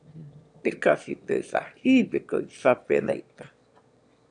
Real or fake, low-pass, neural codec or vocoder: fake; 9.9 kHz; autoencoder, 22.05 kHz, a latent of 192 numbers a frame, VITS, trained on one speaker